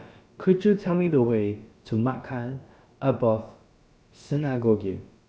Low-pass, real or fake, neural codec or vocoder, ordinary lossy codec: none; fake; codec, 16 kHz, about 1 kbps, DyCAST, with the encoder's durations; none